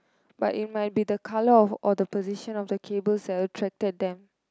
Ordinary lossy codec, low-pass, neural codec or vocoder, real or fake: none; none; none; real